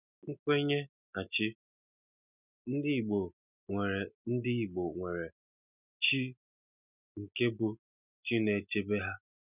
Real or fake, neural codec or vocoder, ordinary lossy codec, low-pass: real; none; none; 3.6 kHz